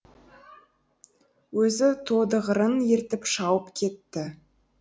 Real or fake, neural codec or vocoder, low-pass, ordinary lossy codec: real; none; none; none